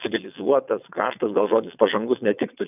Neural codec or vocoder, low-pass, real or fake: vocoder, 22.05 kHz, 80 mel bands, WaveNeXt; 3.6 kHz; fake